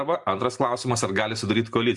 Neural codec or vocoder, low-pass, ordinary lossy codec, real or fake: none; 10.8 kHz; MP3, 64 kbps; real